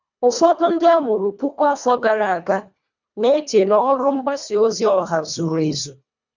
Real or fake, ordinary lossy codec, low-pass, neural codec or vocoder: fake; none; 7.2 kHz; codec, 24 kHz, 1.5 kbps, HILCodec